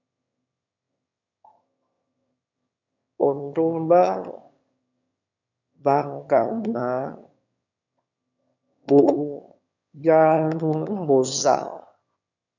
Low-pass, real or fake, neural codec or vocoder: 7.2 kHz; fake; autoencoder, 22.05 kHz, a latent of 192 numbers a frame, VITS, trained on one speaker